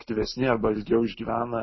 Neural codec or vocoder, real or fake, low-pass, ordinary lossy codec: none; real; 7.2 kHz; MP3, 24 kbps